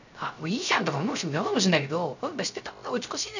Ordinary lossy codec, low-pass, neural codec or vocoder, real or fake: none; 7.2 kHz; codec, 16 kHz, 0.3 kbps, FocalCodec; fake